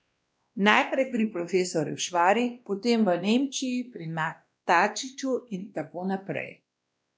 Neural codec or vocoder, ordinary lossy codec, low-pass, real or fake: codec, 16 kHz, 1 kbps, X-Codec, WavLM features, trained on Multilingual LibriSpeech; none; none; fake